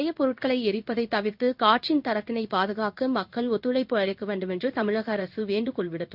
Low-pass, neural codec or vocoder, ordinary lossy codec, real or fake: 5.4 kHz; codec, 16 kHz in and 24 kHz out, 1 kbps, XY-Tokenizer; none; fake